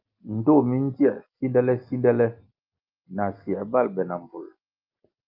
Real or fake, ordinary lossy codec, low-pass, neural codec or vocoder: real; Opus, 32 kbps; 5.4 kHz; none